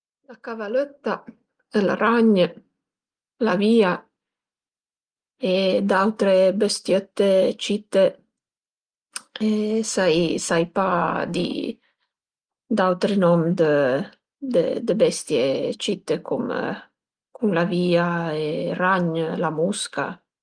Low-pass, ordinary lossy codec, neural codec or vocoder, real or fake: 9.9 kHz; Opus, 24 kbps; none; real